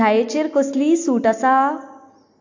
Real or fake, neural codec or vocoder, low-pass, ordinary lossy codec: real; none; 7.2 kHz; AAC, 48 kbps